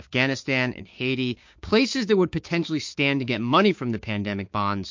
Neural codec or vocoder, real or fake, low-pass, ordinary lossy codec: codec, 16 kHz, 6 kbps, DAC; fake; 7.2 kHz; MP3, 48 kbps